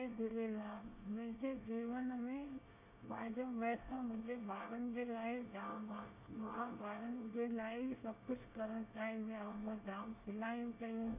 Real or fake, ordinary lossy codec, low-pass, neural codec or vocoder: fake; none; 3.6 kHz; codec, 24 kHz, 1 kbps, SNAC